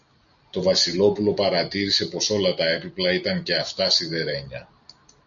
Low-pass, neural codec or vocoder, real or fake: 7.2 kHz; none; real